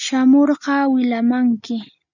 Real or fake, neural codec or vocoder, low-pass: real; none; 7.2 kHz